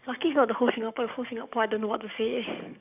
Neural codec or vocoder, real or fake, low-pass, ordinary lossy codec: codec, 44.1 kHz, 7.8 kbps, DAC; fake; 3.6 kHz; none